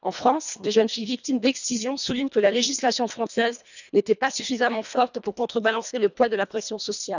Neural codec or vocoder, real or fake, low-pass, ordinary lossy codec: codec, 24 kHz, 1.5 kbps, HILCodec; fake; 7.2 kHz; none